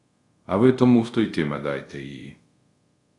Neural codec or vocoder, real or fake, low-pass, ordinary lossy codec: codec, 24 kHz, 0.5 kbps, DualCodec; fake; 10.8 kHz; AAC, 48 kbps